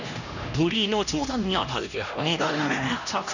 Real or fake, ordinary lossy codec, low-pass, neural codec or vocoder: fake; none; 7.2 kHz; codec, 16 kHz, 1 kbps, X-Codec, HuBERT features, trained on LibriSpeech